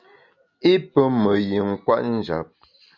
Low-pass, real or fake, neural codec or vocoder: 7.2 kHz; real; none